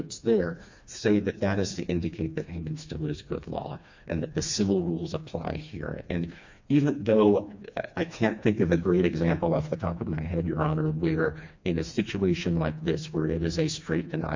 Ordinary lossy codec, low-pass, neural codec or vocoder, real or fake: MP3, 64 kbps; 7.2 kHz; codec, 16 kHz, 2 kbps, FreqCodec, smaller model; fake